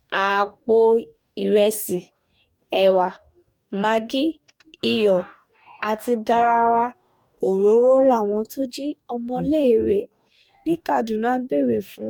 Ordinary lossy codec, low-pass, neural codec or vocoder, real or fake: MP3, 96 kbps; 19.8 kHz; codec, 44.1 kHz, 2.6 kbps, DAC; fake